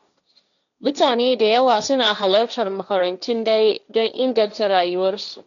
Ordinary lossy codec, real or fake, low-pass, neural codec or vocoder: none; fake; 7.2 kHz; codec, 16 kHz, 1.1 kbps, Voila-Tokenizer